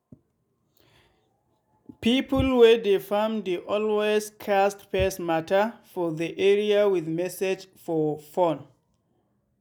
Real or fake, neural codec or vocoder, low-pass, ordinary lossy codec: real; none; none; none